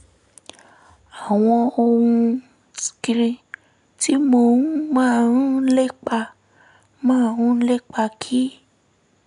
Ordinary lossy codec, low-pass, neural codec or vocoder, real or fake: none; 10.8 kHz; none; real